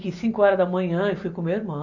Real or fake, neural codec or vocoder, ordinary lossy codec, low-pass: real; none; none; 7.2 kHz